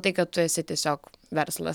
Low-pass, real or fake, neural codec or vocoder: 19.8 kHz; real; none